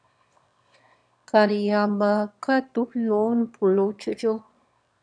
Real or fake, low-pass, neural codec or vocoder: fake; 9.9 kHz; autoencoder, 22.05 kHz, a latent of 192 numbers a frame, VITS, trained on one speaker